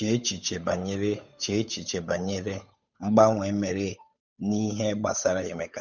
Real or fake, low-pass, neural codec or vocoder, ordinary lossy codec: fake; 7.2 kHz; codec, 16 kHz, 8 kbps, FunCodec, trained on Chinese and English, 25 frames a second; none